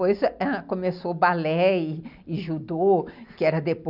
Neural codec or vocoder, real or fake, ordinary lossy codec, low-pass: none; real; none; 5.4 kHz